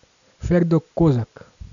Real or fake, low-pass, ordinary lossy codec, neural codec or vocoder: real; 7.2 kHz; none; none